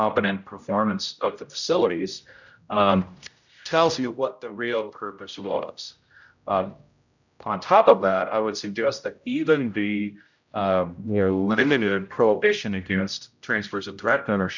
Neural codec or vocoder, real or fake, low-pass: codec, 16 kHz, 0.5 kbps, X-Codec, HuBERT features, trained on general audio; fake; 7.2 kHz